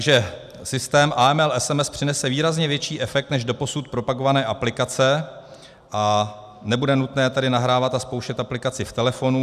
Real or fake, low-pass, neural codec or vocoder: real; 14.4 kHz; none